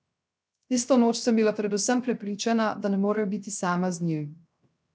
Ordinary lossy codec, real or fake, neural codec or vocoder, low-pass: none; fake; codec, 16 kHz, 0.3 kbps, FocalCodec; none